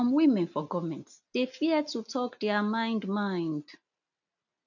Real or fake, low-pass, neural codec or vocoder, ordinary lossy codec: real; 7.2 kHz; none; none